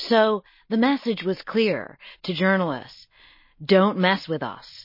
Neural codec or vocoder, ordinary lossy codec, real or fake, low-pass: none; MP3, 24 kbps; real; 5.4 kHz